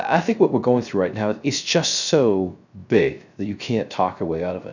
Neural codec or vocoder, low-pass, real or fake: codec, 16 kHz, 0.3 kbps, FocalCodec; 7.2 kHz; fake